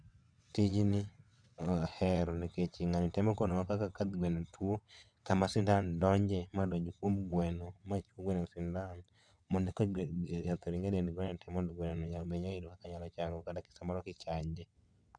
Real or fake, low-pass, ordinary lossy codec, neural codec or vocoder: fake; 9.9 kHz; none; vocoder, 22.05 kHz, 80 mel bands, WaveNeXt